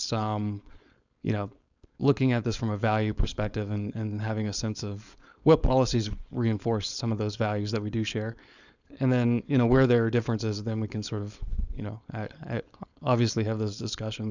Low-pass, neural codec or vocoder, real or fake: 7.2 kHz; codec, 16 kHz, 4.8 kbps, FACodec; fake